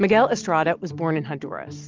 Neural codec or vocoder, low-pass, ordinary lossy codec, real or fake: none; 7.2 kHz; Opus, 24 kbps; real